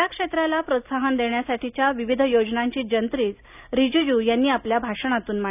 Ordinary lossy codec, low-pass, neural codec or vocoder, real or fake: none; 3.6 kHz; none; real